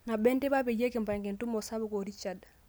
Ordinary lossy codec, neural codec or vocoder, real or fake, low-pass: none; none; real; none